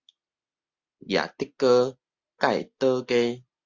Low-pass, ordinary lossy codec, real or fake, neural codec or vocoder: 7.2 kHz; Opus, 64 kbps; real; none